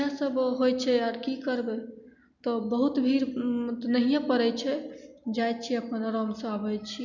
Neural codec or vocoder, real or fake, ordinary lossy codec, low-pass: none; real; none; 7.2 kHz